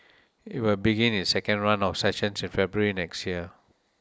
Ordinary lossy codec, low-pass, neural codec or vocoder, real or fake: none; none; none; real